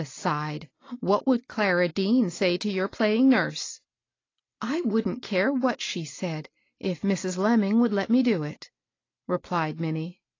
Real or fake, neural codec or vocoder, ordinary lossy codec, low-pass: real; none; AAC, 32 kbps; 7.2 kHz